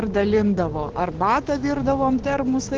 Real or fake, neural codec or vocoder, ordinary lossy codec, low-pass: fake; codec, 16 kHz, 6 kbps, DAC; Opus, 16 kbps; 7.2 kHz